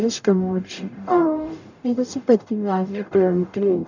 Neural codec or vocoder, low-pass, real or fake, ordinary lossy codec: codec, 44.1 kHz, 0.9 kbps, DAC; 7.2 kHz; fake; none